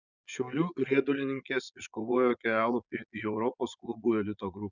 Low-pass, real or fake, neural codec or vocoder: 7.2 kHz; fake; vocoder, 22.05 kHz, 80 mel bands, Vocos